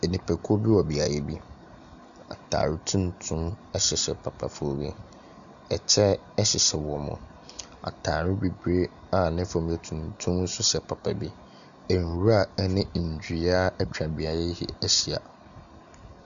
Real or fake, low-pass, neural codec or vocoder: real; 7.2 kHz; none